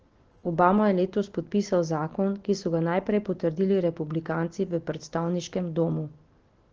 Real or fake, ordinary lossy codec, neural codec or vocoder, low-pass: real; Opus, 16 kbps; none; 7.2 kHz